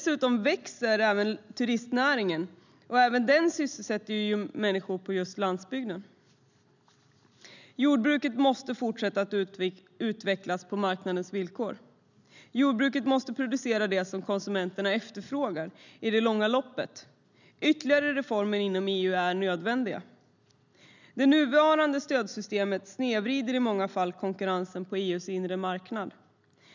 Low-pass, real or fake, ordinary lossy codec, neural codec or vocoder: 7.2 kHz; real; none; none